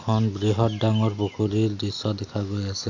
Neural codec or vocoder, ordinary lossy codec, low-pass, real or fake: none; none; 7.2 kHz; real